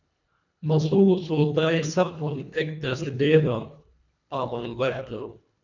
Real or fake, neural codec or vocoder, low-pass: fake; codec, 24 kHz, 1.5 kbps, HILCodec; 7.2 kHz